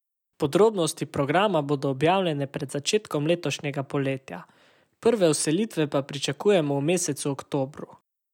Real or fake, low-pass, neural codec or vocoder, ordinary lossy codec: real; 19.8 kHz; none; none